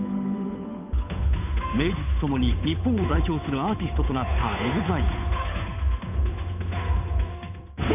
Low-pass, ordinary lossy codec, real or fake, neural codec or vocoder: 3.6 kHz; none; fake; codec, 16 kHz, 8 kbps, FunCodec, trained on Chinese and English, 25 frames a second